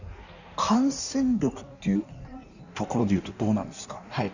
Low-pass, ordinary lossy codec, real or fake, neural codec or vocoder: 7.2 kHz; none; fake; codec, 16 kHz in and 24 kHz out, 1.1 kbps, FireRedTTS-2 codec